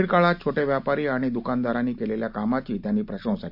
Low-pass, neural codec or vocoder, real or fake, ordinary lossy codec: 5.4 kHz; none; real; none